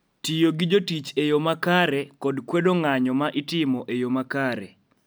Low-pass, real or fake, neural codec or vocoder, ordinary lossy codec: none; real; none; none